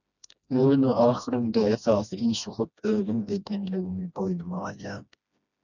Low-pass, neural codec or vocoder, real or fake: 7.2 kHz; codec, 16 kHz, 1 kbps, FreqCodec, smaller model; fake